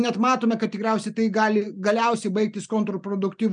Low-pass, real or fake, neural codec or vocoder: 9.9 kHz; real; none